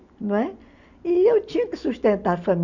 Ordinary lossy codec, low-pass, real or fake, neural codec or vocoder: none; 7.2 kHz; real; none